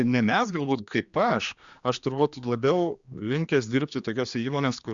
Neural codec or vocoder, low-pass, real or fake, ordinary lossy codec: codec, 16 kHz, 2 kbps, X-Codec, HuBERT features, trained on general audio; 7.2 kHz; fake; Opus, 64 kbps